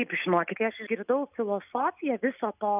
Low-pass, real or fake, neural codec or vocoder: 3.6 kHz; real; none